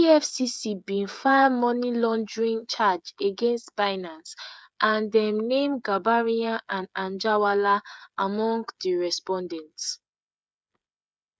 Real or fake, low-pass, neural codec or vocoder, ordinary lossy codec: fake; none; codec, 16 kHz, 16 kbps, FreqCodec, smaller model; none